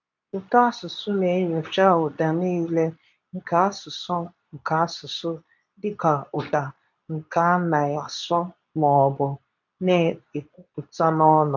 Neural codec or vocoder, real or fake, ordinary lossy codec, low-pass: codec, 24 kHz, 0.9 kbps, WavTokenizer, medium speech release version 2; fake; none; 7.2 kHz